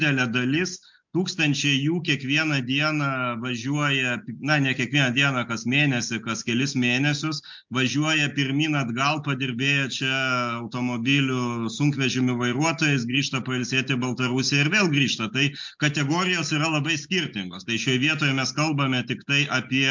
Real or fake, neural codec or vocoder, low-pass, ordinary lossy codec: real; none; 7.2 kHz; MP3, 64 kbps